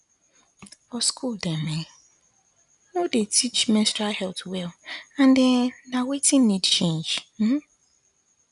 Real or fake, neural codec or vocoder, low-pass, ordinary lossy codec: real; none; 10.8 kHz; none